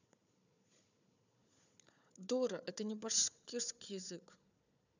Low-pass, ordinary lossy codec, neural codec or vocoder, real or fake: 7.2 kHz; none; codec, 16 kHz, 4 kbps, FunCodec, trained on Chinese and English, 50 frames a second; fake